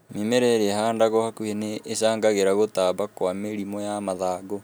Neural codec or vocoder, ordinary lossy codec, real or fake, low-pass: none; none; real; none